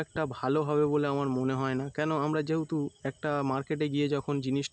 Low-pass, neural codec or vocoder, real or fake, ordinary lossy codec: none; none; real; none